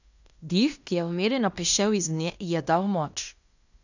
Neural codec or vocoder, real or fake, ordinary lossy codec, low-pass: codec, 16 kHz in and 24 kHz out, 0.9 kbps, LongCat-Audio-Codec, fine tuned four codebook decoder; fake; none; 7.2 kHz